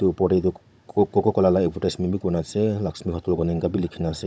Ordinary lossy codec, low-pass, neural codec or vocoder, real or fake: none; none; codec, 16 kHz, 16 kbps, FunCodec, trained on Chinese and English, 50 frames a second; fake